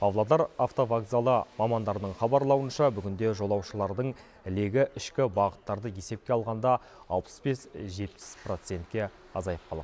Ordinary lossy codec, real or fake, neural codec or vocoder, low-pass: none; real; none; none